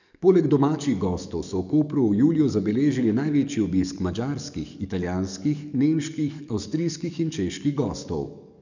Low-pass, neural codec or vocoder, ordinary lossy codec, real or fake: 7.2 kHz; codec, 44.1 kHz, 7.8 kbps, DAC; none; fake